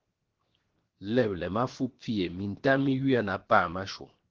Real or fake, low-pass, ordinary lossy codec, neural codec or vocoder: fake; 7.2 kHz; Opus, 16 kbps; codec, 16 kHz, 0.7 kbps, FocalCodec